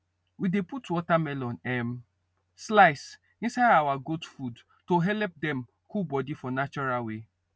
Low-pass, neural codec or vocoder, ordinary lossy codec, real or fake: none; none; none; real